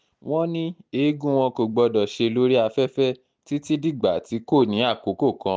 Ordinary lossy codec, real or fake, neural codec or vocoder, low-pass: none; real; none; none